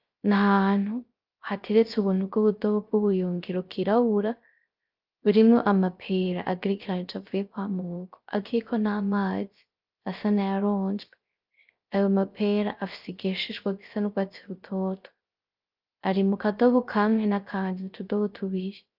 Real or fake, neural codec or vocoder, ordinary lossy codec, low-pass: fake; codec, 16 kHz, 0.3 kbps, FocalCodec; Opus, 24 kbps; 5.4 kHz